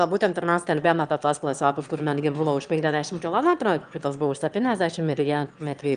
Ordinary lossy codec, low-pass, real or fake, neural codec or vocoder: Opus, 32 kbps; 9.9 kHz; fake; autoencoder, 22.05 kHz, a latent of 192 numbers a frame, VITS, trained on one speaker